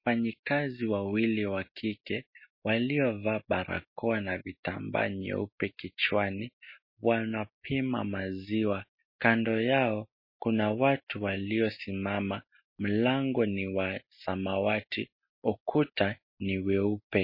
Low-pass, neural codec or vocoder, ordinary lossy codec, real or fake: 5.4 kHz; none; MP3, 24 kbps; real